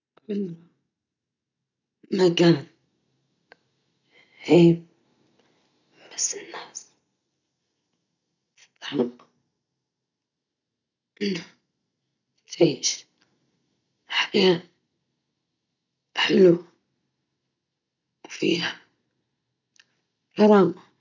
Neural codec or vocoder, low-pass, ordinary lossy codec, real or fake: none; 7.2 kHz; none; real